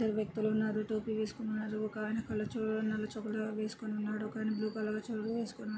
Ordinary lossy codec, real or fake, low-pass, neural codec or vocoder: none; real; none; none